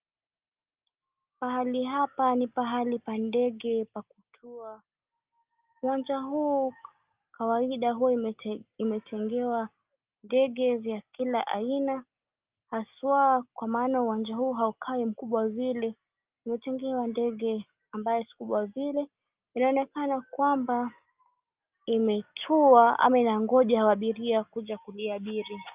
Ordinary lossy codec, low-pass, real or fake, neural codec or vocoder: Opus, 24 kbps; 3.6 kHz; real; none